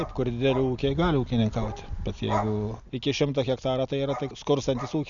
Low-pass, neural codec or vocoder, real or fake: 7.2 kHz; none; real